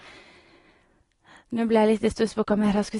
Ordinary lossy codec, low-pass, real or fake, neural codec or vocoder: AAC, 32 kbps; 10.8 kHz; real; none